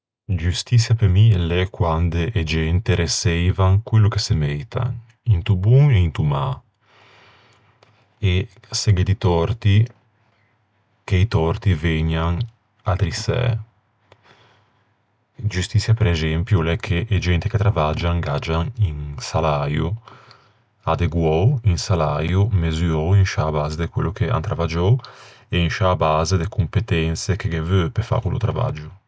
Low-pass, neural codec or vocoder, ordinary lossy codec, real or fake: none; none; none; real